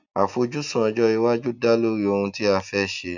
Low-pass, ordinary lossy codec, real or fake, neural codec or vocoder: 7.2 kHz; none; real; none